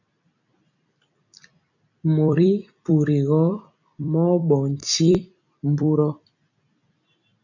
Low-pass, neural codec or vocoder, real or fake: 7.2 kHz; vocoder, 44.1 kHz, 128 mel bands every 256 samples, BigVGAN v2; fake